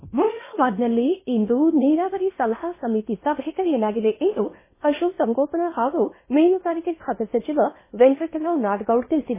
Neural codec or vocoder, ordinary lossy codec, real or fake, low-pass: codec, 16 kHz in and 24 kHz out, 0.8 kbps, FocalCodec, streaming, 65536 codes; MP3, 16 kbps; fake; 3.6 kHz